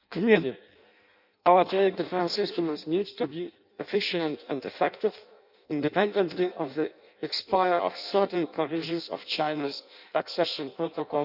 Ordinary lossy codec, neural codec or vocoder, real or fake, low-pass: none; codec, 16 kHz in and 24 kHz out, 0.6 kbps, FireRedTTS-2 codec; fake; 5.4 kHz